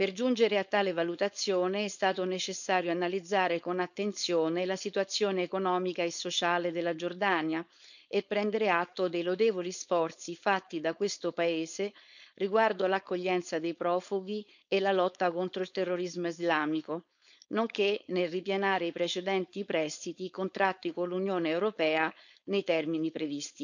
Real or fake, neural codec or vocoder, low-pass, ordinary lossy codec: fake; codec, 16 kHz, 4.8 kbps, FACodec; 7.2 kHz; none